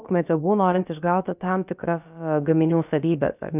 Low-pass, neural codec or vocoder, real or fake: 3.6 kHz; codec, 16 kHz, about 1 kbps, DyCAST, with the encoder's durations; fake